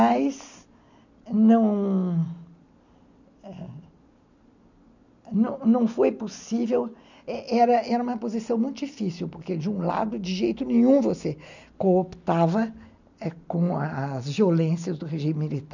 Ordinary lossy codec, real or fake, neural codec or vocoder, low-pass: none; real; none; 7.2 kHz